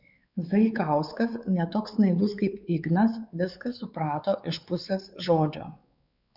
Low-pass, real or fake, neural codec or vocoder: 5.4 kHz; fake; codec, 16 kHz, 4 kbps, X-Codec, WavLM features, trained on Multilingual LibriSpeech